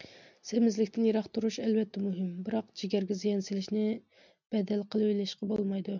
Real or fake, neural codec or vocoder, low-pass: real; none; 7.2 kHz